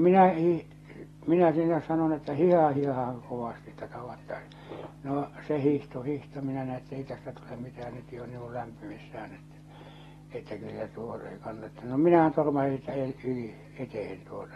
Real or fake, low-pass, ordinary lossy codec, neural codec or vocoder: real; 14.4 kHz; AAC, 32 kbps; none